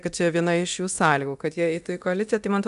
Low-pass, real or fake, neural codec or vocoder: 10.8 kHz; fake; codec, 24 kHz, 0.9 kbps, DualCodec